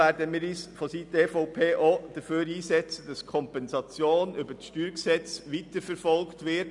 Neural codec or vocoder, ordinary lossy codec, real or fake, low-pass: none; MP3, 96 kbps; real; 10.8 kHz